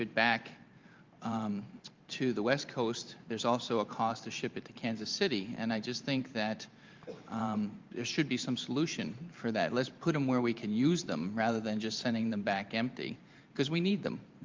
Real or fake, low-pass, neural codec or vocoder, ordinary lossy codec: fake; 7.2 kHz; vocoder, 44.1 kHz, 128 mel bands every 512 samples, BigVGAN v2; Opus, 32 kbps